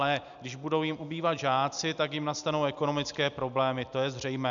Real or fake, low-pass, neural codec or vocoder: real; 7.2 kHz; none